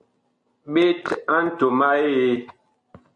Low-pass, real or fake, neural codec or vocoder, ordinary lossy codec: 9.9 kHz; real; none; MP3, 48 kbps